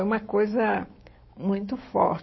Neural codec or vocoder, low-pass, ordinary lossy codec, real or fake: autoencoder, 48 kHz, 128 numbers a frame, DAC-VAE, trained on Japanese speech; 7.2 kHz; MP3, 24 kbps; fake